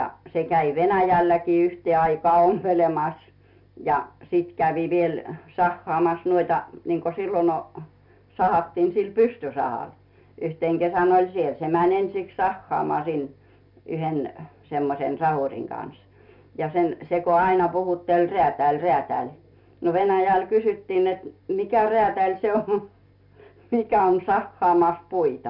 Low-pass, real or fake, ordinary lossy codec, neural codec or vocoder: 5.4 kHz; real; none; none